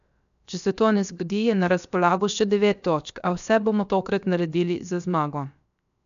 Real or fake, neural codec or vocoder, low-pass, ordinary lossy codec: fake; codec, 16 kHz, 0.7 kbps, FocalCodec; 7.2 kHz; none